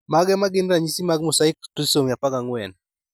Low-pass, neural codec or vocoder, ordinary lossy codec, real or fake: none; none; none; real